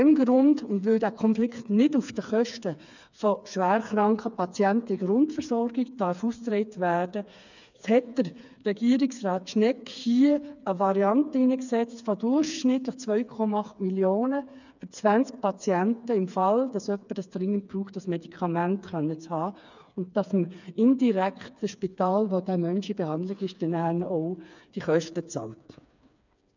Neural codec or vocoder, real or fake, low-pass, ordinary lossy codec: codec, 16 kHz, 4 kbps, FreqCodec, smaller model; fake; 7.2 kHz; none